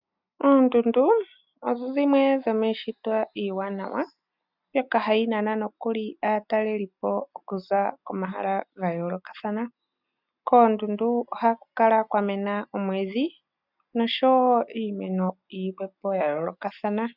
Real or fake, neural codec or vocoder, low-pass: real; none; 5.4 kHz